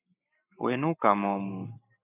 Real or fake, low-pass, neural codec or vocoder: fake; 3.6 kHz; codec, 44.1 kHz, 7.8 kbps, Pupu-Codec